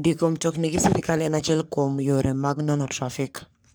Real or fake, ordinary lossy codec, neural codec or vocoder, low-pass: fake; none; codec, 44.1 kHz, 3.4 kbps, Pupu-Codec; none